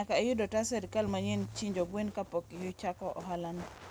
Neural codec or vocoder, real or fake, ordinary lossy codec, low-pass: none; real; none; none